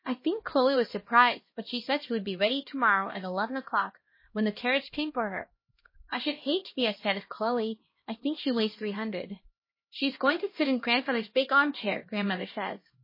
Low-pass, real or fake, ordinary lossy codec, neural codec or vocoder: 5.4 kHz; fake; MP3, 24 kbps; codec, 16 kHz, 1 kbps, X-Codec, HuBERT features, trained on LibriSpeech